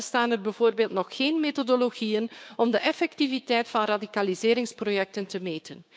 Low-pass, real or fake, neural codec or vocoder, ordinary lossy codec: none; fake; codec, 16 kHz, 6 kbps, DAC; none